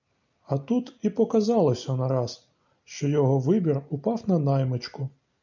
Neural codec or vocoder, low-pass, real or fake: none; 7.2 kHz; real